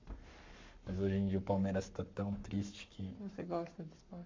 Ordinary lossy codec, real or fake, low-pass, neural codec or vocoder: MP3, 64 kbps; fake; 7.2 kHz; codec, 44.1 kHz, 7.8 kbps, Pupu-Codec